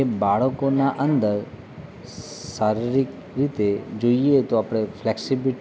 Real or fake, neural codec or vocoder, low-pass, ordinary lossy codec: real; none; none; none